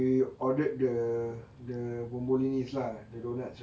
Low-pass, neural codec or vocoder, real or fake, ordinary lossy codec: none; none; real; none